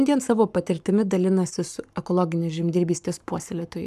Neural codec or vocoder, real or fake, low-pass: codec, 44.1 kHz, 7.8 kbps, Pupu-Codec; fake; 14.4 kHz